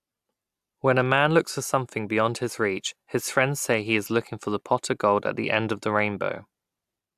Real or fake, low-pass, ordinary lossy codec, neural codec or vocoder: real; 14.4 kHz; AAC, 96 kbps; none